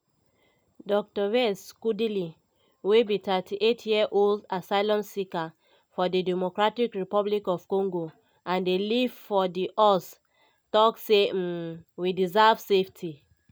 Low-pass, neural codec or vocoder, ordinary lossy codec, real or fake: none; none; none; real